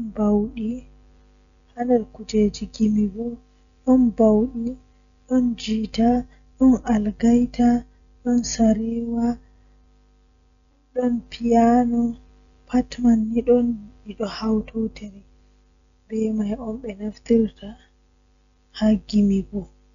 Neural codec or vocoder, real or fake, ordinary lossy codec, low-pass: none; real; none; 7.2 kHz